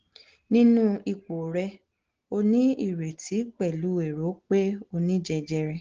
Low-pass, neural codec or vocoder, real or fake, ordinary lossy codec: 7.2 kHz; none; real; Opus, 16 kbps